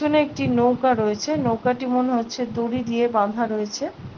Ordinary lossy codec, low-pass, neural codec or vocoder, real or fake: Opus, 16 kbps; 7.2 kHz; none; real